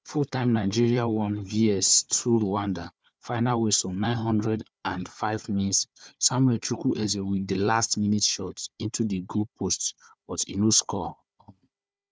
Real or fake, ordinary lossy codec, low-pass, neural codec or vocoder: fake; none; none; codec, 16 kHz, 4 kbps, FunCodec, trained on Chinese and English, 50 frames a second